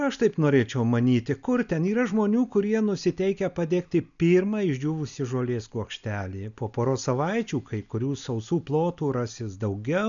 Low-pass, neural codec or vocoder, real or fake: 7.2 kHz; none; real